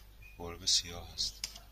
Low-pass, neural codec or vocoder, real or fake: 14.4 kHz; none; real